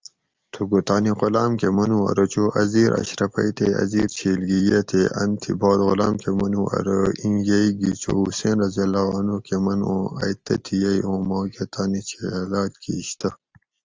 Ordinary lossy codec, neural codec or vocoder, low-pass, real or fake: Opus, 32 kbps; none; 7.2 kHz; real